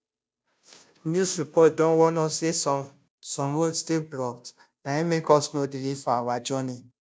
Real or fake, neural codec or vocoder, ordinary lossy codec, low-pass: fake; codec, 16 kHz, 0.5 kbps, FunCodec, trained on Chinese and English, 25 frames a second; none; none